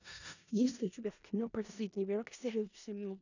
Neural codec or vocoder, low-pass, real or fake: codec, 16 kHz in and 24 kHz out, 0.4 kbps, LongCat-Audio-Codec, four codebook decoder; 7.2 kHz; fake